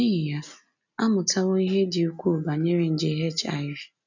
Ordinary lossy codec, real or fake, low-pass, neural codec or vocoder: none; real; 7.2 kHz; none